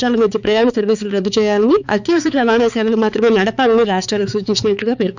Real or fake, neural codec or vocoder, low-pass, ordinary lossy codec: fake; codec, 16 kHz, 4 kbps, X-Codec, HuBERT features, trained on balanced general audio; 7.2 kHz; none